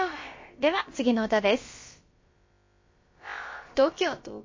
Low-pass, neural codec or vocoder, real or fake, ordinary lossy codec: 7.2 kHz; codec, 16 kHz, about 1 kbps, DyCAST, with the encoder's durations; fake; MP3, 32 kbps